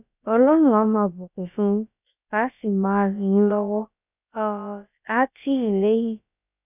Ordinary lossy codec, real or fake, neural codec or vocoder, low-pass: none; fake; codec, 16 kHz, about 1 kbps, DyCAST, with the encoder's durations; 3.6 kHz